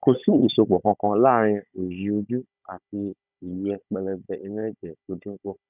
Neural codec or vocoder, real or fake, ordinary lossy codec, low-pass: codec, 16 kHz, 16 kbps, FunCodec, trained on Chinese and English, 50 frames a second; fake; none; 3.6 kHz